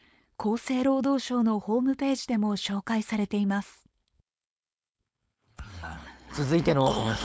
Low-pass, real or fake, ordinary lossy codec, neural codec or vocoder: none; fake; none; codec, 16 kHz, 4.8 kbps, FACodec